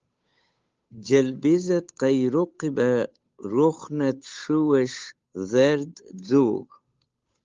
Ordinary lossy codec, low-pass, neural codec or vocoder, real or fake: Opus, 32 kbps; 7.2 kHz; codec, 16 kHz, 8 kbps, FunCodec, trained on Chinese and English, 25 frames a second; fake